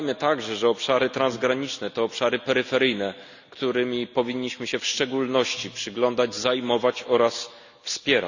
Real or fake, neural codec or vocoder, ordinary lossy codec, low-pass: real; none; none; 7.2 kHz